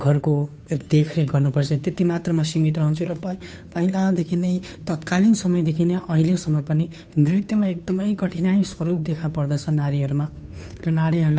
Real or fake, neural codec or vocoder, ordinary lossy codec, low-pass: fake; codec, 16 kHz, 2 kbps, FunCodec, trained on Chinese and English, 25 frames a second; none; none